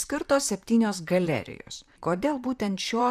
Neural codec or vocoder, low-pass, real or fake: vocoder, 44.1 kHz, 128 mel bands, Pupu-Vocoder; 14.4 kHz; fake